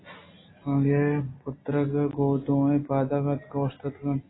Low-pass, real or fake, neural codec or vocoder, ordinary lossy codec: 7.2 kHz; real; none; AAC, 16 kbps